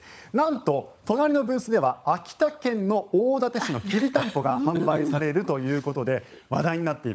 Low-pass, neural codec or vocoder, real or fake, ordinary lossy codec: none; codec, 16 kHz, 16 kbps, FunCodec, trained on LibriTTS, 50 frames a second; fake; none